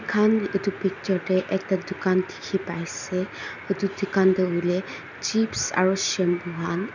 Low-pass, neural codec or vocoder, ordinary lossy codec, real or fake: 7.2 kHz; none; none; real